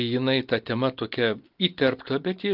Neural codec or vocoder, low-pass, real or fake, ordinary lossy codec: none; 5.4 kHz; real; Opus, 32 kbps